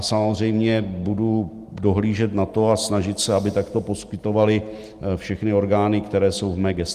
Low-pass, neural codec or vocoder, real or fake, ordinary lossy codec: 14.4 kHz; none; real; Opus, 24 kbps